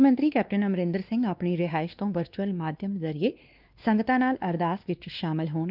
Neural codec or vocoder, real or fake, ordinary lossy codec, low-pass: codec, 16 kHz, 2 kbps, X-Codec, WavLM features, trained on Multilingual LibriSpeech; fake; Opus, 32 kbps; 5.4 kHz